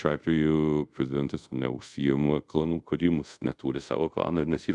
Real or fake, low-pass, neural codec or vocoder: fake; 10.8 kHz; codec, 24 kHz, 0.5 kbps, DualCodec